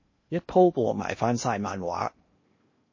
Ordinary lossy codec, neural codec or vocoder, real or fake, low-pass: MP3, 32 kbps; codec, 16 kHz in and 24 kHz out, 0.8 kbps, FocalCodec, streaming, 65536 codes; fake; 7.2 kHz